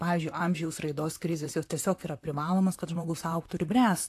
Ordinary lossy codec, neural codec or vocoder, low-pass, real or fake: AAC, 64 kbps; vocoder, 44.1 kHz, 128 mel bands, Pupu-Vocoder; 14.4 kHz; fake